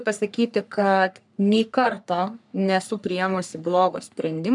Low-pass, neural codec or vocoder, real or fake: 10.8 kHz; codec, 44.1 kHz, 3.4 kbps, Pupu-Codec; fake